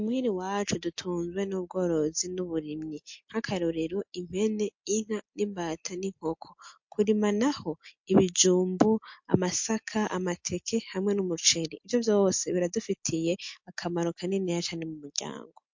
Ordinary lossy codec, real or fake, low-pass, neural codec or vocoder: MP3, 48 kbps; real; 7.2 kHz; none